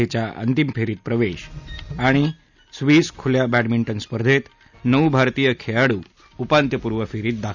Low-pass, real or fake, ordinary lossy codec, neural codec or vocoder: 7.2 kHz; real; none; none